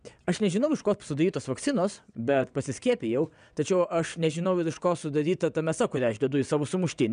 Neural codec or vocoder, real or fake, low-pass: vocoder, 22.05 kHz, 80 mel bands, WaveNeXt; fake; 9.9 kHz